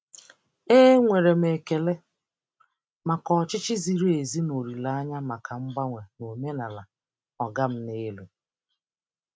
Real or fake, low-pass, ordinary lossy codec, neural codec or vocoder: real; none; none; none